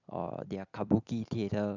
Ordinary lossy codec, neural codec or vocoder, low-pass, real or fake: none; vocoder, 44.1 kHz, 128 mel bands every 256 samples, BigVGAN v2; 7.2 kHz; fake